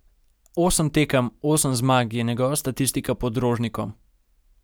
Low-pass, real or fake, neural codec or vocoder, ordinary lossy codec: none; real; none; none